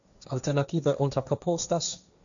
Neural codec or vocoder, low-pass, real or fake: codec, 16 kHz, 1.1 kbps, Voila-Tokenizer; 7.2 kHz; fake